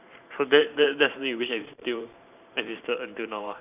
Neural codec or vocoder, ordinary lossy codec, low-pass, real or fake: vocoder, 44.1 kHz, 128 mel bands every 512 samples, BigVGAN v2; none; 3.6 kHz; fake